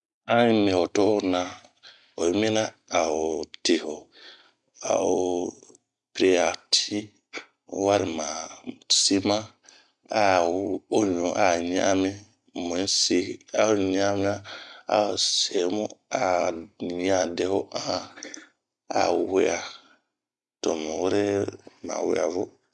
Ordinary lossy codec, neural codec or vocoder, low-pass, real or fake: none; none; 10.8 kHz; real